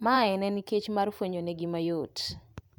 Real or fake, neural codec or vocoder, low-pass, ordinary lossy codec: fake; vocoder, 44.1 kHz, 128 mel bands every 512 samples, BigVGAN v2; none; none